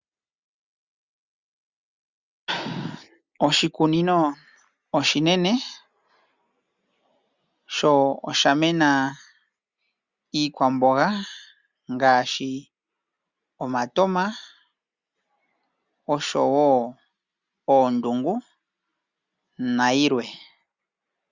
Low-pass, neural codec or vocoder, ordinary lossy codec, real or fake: 7.2 kHz; none; Opus, 64 kbps; real